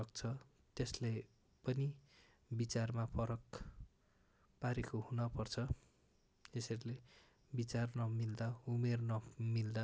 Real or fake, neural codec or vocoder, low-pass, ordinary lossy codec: real; none; none; none